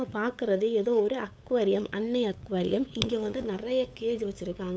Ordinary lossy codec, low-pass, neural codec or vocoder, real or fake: none; none; codec, 16 kHz, 8 kbps, FunCodec, trained on LibriTTS, 25 frames a second; fake